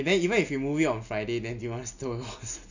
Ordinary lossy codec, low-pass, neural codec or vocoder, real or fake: none; 7.2 kHz; none; real